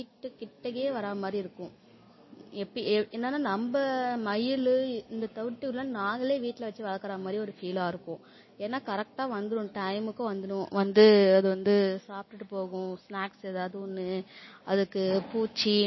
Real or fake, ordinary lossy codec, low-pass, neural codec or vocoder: real; MP3, 24 kbps; 7.2 kHz; none